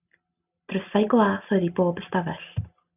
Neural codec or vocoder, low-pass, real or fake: none; 3.6 kHz; real